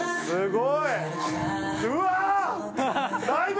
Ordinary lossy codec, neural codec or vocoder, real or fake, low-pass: none; none; real; none